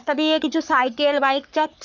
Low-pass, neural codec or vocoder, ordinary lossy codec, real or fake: 7.2 kHz; codec, 44.1 kHz, 3.4 kbps, Pupu-Codec; none; fake